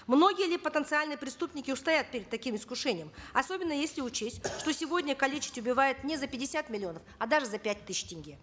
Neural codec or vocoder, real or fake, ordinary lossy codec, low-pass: none; real; none; none